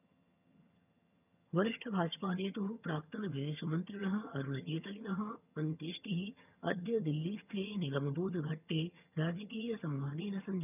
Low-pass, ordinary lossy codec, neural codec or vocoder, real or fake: 3.6 kHz; none; vocoder, 22.05 kHz, 80 mel bands, HiFi-GAN; fake